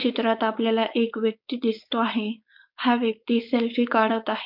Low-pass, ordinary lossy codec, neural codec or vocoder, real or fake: 5.4 kHz; MP3, 48 kbps; codec, 16 kHz, 4.8 kbps, FACodec; fake